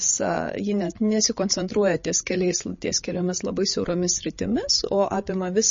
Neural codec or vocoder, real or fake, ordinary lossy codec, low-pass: codec, 16 kHz, 8 kbps, FreqCodec, larger model; fake; MP3, 32 kbps; 7.2 kHz